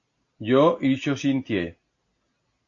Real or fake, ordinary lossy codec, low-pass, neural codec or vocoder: real; AAC, 48 kbps; 7.2 kHz; none